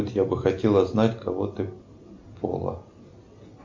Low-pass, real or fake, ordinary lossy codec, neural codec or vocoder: 7.2 kHz; real; MP3, 64 kbps; none